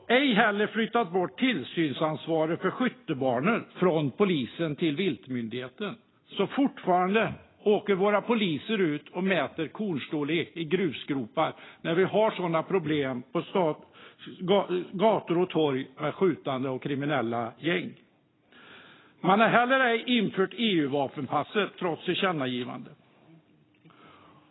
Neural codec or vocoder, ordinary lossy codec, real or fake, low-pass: none; AAC, 16 kbps; real; 7.2 kHz